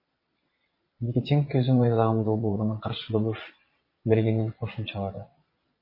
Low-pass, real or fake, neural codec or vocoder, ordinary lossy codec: 5.4 kHz; fake; vocoder, 22.05 kHz, 80 mel bands, Vocos; MP3, 24 kbps